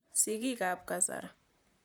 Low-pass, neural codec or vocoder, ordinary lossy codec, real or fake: none; vocoder, 44.1 kHz, 128 mel bands, Pupu-Vocoder; none; fake